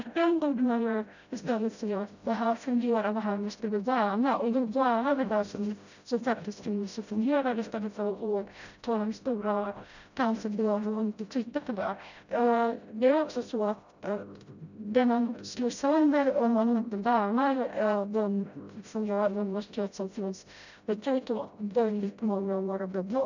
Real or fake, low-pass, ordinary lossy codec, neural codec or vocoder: fake; 7.2 kHz; none; codec, 16 kHz, 0.5 kbps, FreqCodec, smaller model